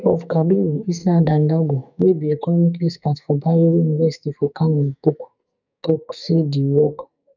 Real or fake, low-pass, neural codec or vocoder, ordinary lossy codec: fake; 7.2 kHz; codec, 44.1 kHz, 2.6 kbps, SNAC; none